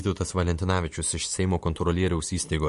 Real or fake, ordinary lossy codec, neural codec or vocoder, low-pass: real; MP3, 48 kbps; none; 10.8 kHz